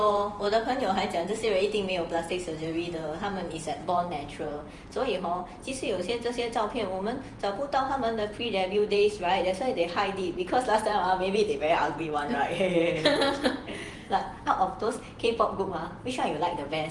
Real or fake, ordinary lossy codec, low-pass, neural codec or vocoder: fake; Opus, 24 kbps; 10.8 kHz; vocoder, 48 kHz, 128 mel bands, Vocos